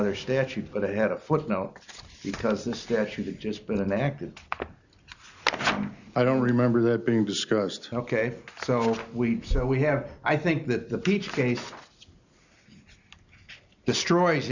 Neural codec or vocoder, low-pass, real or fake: none; 7.2 kHz; real